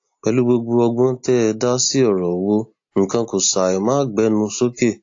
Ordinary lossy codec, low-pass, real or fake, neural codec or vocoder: AAC, 48 kbps; 7.2 kHz; real; none